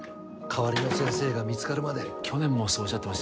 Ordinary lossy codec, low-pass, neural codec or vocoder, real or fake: none; none; none; real